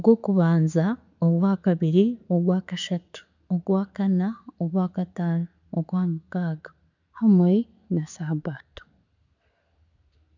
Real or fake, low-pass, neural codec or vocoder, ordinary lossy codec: real; 7.2 kHz; none; none